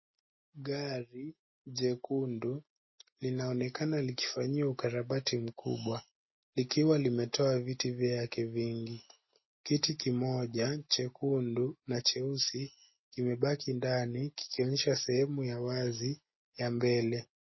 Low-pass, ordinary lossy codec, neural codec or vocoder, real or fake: 7.2 kHz; MP3, 24 kbps; none; real